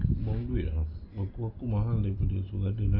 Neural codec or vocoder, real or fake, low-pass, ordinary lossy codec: none; real; 5.4 kHz; AAC, 48 kbps